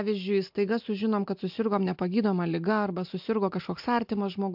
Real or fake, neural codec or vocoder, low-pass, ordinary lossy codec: real; none; 5.4 kHz; MP3, 48 kbps